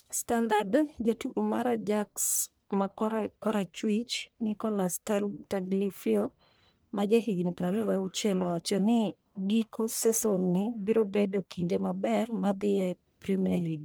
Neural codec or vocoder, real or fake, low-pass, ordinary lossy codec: codec, 44.1 kHz, 1.7 kbps, Pupu-Codec; fake; none; none